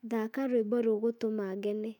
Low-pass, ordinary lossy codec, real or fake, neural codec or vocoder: 19.8 kHz; none; fake; autoencoder, 48 kHz, 128 numbers a frame, DAC-VAE, trained on Japanese speech